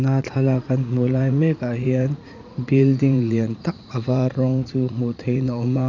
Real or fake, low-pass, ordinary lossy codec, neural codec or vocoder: fake; 7.2 kHz; none; vocoder, 44.1 kHz, 80 mel bands, Vocos